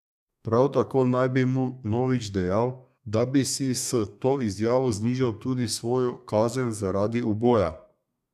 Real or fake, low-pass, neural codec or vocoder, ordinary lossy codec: fake; 14.4 kHz; codec, 32 kHz, 1.9 kbps, SNAC; none